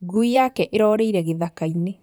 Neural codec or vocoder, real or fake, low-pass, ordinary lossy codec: vocoder, 44.1 kHz, 128 mel bands, Pupu-Vocoder; fake; none; none